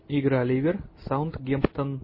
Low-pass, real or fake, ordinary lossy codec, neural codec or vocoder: 5.4 kHz; real; MP3, 24 kbps; none